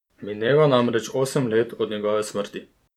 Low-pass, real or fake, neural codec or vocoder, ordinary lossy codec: 19.8 kHz; fake; vocoder, 44.1 kHz, 128 mel bands every 256 samples, BigVGAN v2; none